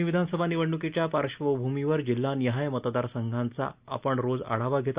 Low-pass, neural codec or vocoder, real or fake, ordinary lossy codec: 3.6 kHz; none; real; Opus, 64 kbps